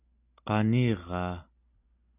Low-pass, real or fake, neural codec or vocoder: 3.6 kHz; real; none